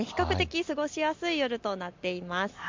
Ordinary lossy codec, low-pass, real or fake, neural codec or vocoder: MP3, 64 kbps; 7.2 kHz; real; none